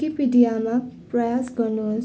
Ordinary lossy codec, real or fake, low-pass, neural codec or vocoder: none; real; none; none